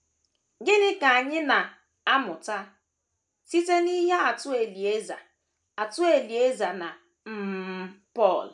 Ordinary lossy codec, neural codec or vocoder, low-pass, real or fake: none; none; 10.8 kHz; real